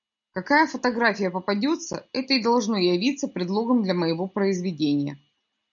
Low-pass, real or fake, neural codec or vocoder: 7.2 kHz; real; none